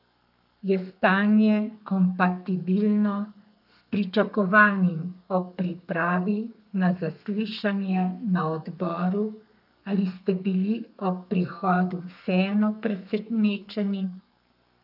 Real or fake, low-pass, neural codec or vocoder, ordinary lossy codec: fake; 5.4 kHz; codec, 32 kHz, 1.9 kbps, SNAC; none